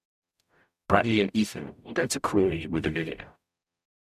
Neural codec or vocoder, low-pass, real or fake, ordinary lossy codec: codec, 44.1 kHz, 0.9 kbps, DAC; 14.4 kHz; fake; none